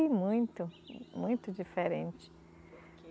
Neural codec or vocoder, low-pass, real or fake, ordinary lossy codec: none; none; real; none